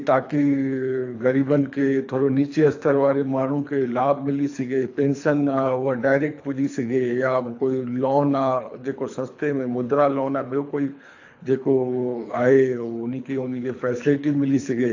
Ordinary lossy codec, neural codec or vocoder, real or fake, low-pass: AAC, 48 kbps; codec, 24 kHz, 3 kbps, HILCodec; fake; 7.2 kHz